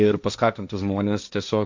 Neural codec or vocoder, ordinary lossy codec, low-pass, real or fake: codec, 16 kHz, 1.1 kbps, Voila-Tokenizer; MP3, 64 kbps; 7.2 kHz; fake